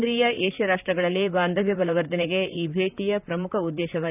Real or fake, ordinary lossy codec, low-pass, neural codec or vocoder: fake; none; 3.6 kHz; vocoder, 44.1 kHz, 128 mel bands, Pupu-Vocoder